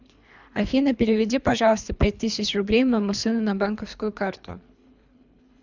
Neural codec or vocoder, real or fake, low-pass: codec, 24 kHz, 3 kbps, HILCodec; fake; 7.2 kHz